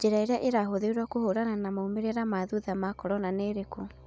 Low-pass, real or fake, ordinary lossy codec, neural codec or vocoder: none; real; none; none